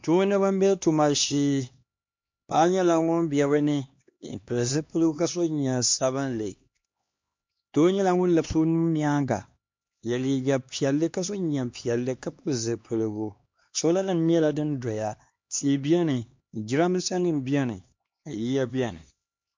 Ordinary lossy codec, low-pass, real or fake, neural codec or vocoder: MP3, 48 kbps; 7.2 kHz; fake; codec, 16 kHz, 2 kbps, X-Codec, HuBERT features, trained on LibriSpeech